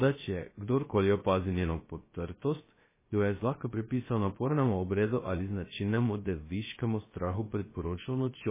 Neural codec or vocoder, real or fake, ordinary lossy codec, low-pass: codec, 16 kHz, 0.3 kbps, FocalCodec; fake; MP3, 16 kbps; 3.6 kHz